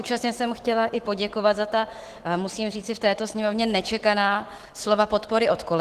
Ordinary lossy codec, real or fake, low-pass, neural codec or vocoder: Opus, 16 kbps; fake; 14.4 kHz; autoencoder, 48 kHz, 128 numbers a frame, DAC-VAE, trained on Japanese speech